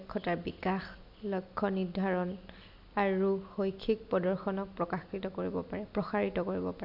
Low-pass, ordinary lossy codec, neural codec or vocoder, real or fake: 5.4 kHz; AAC, 48 kbps; none; real